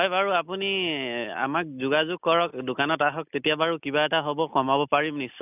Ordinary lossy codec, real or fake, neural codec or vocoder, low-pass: none; real; none; 3.6 kHz